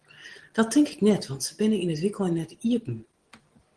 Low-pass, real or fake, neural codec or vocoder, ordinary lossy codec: 9.9 kHz; real; none; Opus, 16 kbps